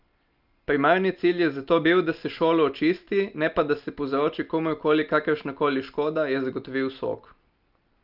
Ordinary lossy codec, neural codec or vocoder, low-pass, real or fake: Opus, 24 kbps; none; 5.4 kHz; real